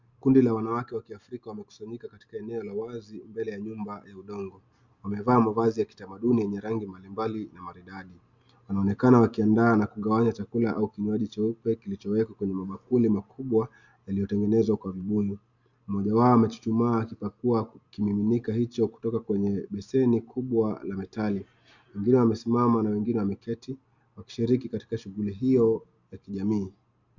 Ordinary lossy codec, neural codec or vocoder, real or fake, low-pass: Opus, 64 kbps; none; real; 7.2 kHz